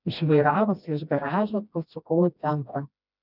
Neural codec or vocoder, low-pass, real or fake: codec, 16 kHz, 1 kbps, FreqCodec, smaller model; 5.4 kHz; fake